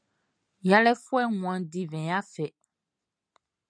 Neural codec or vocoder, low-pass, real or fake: none; 9.9 kHz; real